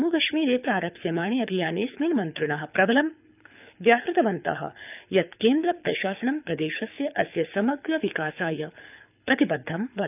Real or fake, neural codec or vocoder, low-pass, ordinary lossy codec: fake; codec, 24 kHz, 6 kbps, HILCodec; 3.6 kHz; none